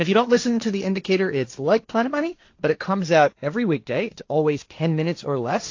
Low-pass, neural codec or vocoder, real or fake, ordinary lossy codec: 7.2 kHz; codec, 16 kHz, 1.1 kbps, Voila-Tokenizer; fake; AAC, 48 kbps